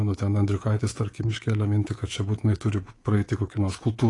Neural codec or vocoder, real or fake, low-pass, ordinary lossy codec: codec, 24 kHz, 3.1 kbps, DualCodec; fake; 10.8 kHz; AAC, 32 kbps